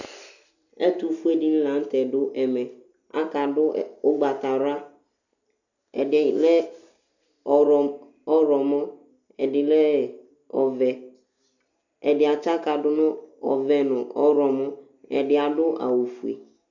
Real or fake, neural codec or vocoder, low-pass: real; none; 7.2 kHz